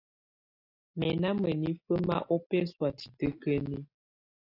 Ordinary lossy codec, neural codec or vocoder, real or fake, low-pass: AAC, 32 kbps; none; real; 5.4 kHz